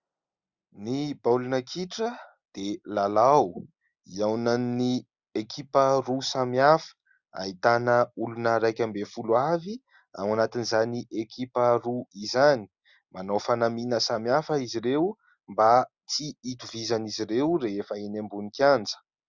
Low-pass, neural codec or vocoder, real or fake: 7.2 kHz; none; real